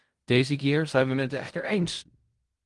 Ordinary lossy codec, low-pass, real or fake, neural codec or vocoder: Opus, 24 kbps; 10.8 kHz; fake; codec, 16 kHz in and 24 kHz out, 0.4 kbps, LongCat-Audio-Codec, fine tuned four codebook decoder